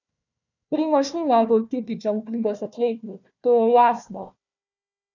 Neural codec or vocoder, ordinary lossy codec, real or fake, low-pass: codec, 16 kHz, 1 kbps, FunCodec, trained on Chinese and English, 50 frames a second; none; fake; 7.2 kHz